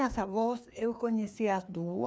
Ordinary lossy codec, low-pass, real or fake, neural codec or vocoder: none; none; fake; codec, 16 kHz, 2 kbps, FreqCodec, larger model